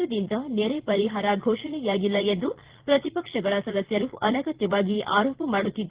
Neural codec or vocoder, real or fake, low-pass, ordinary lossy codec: vocoder, 22.05 kHz, 80 mel bands, Vocos; fake; 3.6 kHz; Opus, 16 kbps